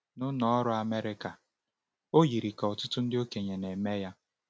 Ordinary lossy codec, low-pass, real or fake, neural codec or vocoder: none; none; real; none